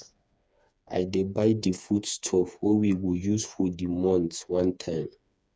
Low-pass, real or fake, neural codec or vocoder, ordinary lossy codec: none; fake; codec, 16 kHz, 4 kbps, FreqCodec, smaller model; none